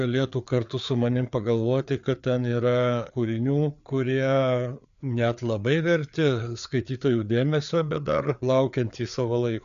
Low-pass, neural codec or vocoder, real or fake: 7.2 kHz; codec, 16 kHz, 4 kbps, FreqCodec, larger model; fake